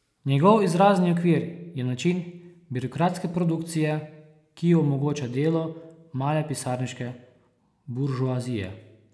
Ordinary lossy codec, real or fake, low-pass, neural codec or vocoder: none; real; none; none